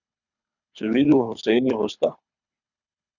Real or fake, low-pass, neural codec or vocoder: fake; 7.2 kHz; codec, 24 kHz, 3 kbps, HILCodec